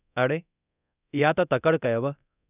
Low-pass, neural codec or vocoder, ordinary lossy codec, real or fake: 3.6 kHz; codec, 24 kHz, 0.9 kbps, DualCodec; none; fake